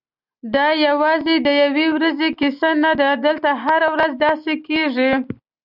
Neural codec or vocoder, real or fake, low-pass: none; real; 5.4 kHz